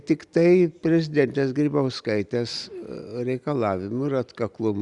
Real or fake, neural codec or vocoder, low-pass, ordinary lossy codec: real; none; 10.8 kHz; Opus, 64 kbps